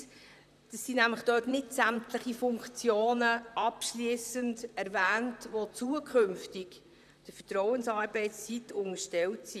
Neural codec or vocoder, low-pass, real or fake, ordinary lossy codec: vocoder, 44.1 kHz, 128 mel bands, Pupu-Vocoder; 14.4 kHz; fake; none